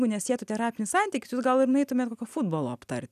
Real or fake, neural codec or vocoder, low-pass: real; none; 14.4 kHz